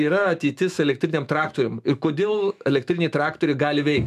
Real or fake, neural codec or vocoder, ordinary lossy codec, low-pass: fake; autoencoder, 48 kHz, 128 numbers a frame, DAC-VAE, trained on Japanese speech; MP3, 96 kbps; 14.4 kHz